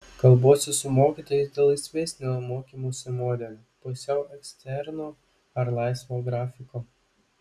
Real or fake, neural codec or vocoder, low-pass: real; none; 14.4 kHz